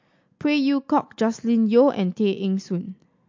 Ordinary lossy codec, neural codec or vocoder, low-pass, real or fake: MP3, 48 kbps; none; 7.2 kHz; real